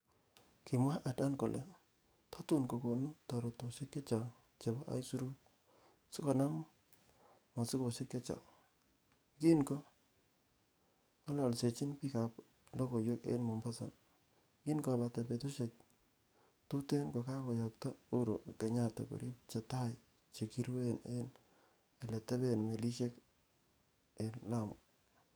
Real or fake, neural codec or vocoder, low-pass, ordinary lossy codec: fake; codec, 44.1 kHz, 7.8 kbps, DAC; none; none